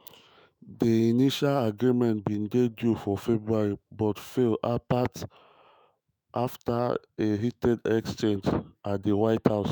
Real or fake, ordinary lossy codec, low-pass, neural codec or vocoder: fake; none; none; autoencoder, 48 kHz, 128 numbers a frame, DAC-VAE, trained on Japanese speech